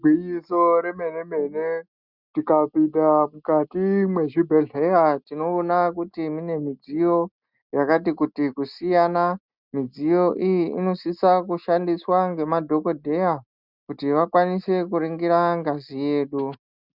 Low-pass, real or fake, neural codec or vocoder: 5.4 kHz; real; none